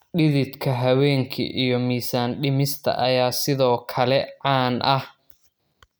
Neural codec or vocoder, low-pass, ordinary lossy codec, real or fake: none; none; none; real